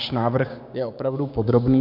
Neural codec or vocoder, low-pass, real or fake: codec, 16 kHz, 6 kbps, DAC; 5.4 kHz; fake